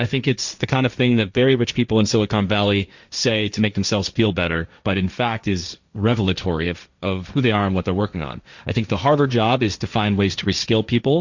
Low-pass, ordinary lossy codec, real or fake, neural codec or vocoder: 7.2 kHz; Opus, 64 kbps; fake; codec, 16 kHz, 1.1 kbps, Voila-Tokenizer